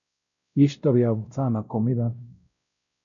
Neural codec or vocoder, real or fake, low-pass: codec, 16 kHz, 0.5 kbps, X-Codec, WavLM features, trained on Multilingual LibriSpeech; fake; 7.2 kHz